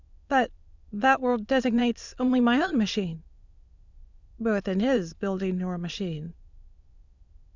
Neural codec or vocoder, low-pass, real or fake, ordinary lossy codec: autoencoder, 22.05 kHz, a latent of 192 numbers a frame, VITS, trained on many speakers; 7.2 kHz; fake; Opus, 64 kbps